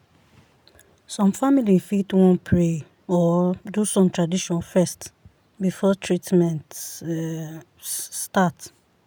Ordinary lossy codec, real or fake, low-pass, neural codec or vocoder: none; real; none; none